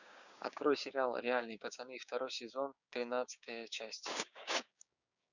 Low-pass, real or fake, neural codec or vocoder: 7.2 kHz; fake; codec, 16 kHz, 6 kbps, DAC